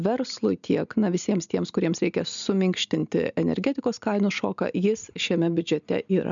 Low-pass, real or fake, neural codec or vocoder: 7.2 kHz; real; none